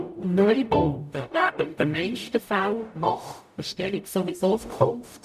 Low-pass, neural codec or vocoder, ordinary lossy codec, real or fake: 14.4 kHz; codec, 44.1 kHz, 0.9 kbps, DAC; none; fake